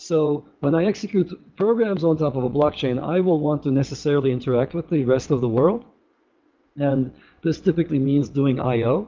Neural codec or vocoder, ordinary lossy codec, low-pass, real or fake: vocoder, 22.05 kHz, 80 mel bands, WaveNeXt; Opus, 24 kbps; 7.2 kHz; fake